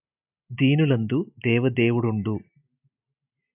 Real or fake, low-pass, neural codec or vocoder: real; 3.6 kHz; none